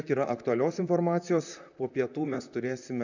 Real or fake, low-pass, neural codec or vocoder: fake; 7.2 kHz; vocoder, 44.1 kHz, 128 mel bands, Pupu-Vocoder